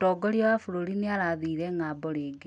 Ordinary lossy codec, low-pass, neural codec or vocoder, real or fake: none; 9.9 kHz; none; real